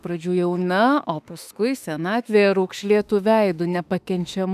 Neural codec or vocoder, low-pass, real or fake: autoencoder, 48 kHz, 32 numbers a frame, DAC-VAE, trained on Japanese speech; 14.4 kHz; fake